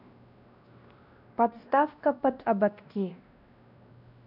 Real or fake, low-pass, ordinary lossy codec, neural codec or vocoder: fake; 5.4 kHz; none; codec, 16 kHz, 1 kbps, X-Codec, WavLM features, trained on Multilingual LibriSpeech